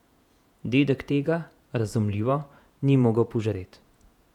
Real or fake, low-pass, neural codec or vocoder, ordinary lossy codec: fake; 19.8 kHz; vocoder, 48 kHz, 128 mel bands, Vocos; none